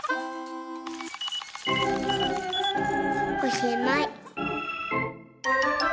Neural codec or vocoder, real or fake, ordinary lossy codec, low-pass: none; real; none; none